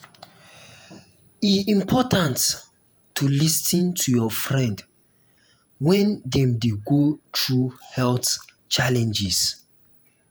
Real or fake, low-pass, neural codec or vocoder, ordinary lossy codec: fake; none; vocoder, 48 kHz, 128 mel bands, Vocos; none